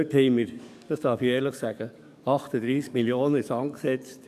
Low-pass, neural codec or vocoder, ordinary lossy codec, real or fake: 14.4 kHz; codec, 44.1 kHz, 7.8 kbps, DAC; none; fake